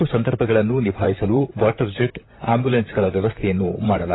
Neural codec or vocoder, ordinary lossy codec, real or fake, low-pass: vocoder, 44.1 kHz, 128 mel bands, Pupu-Vocoder; AAC, 16 kbps; fake; 7.2 kHz